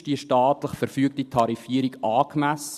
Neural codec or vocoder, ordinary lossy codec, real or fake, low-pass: none; none; real; 14.4 kHz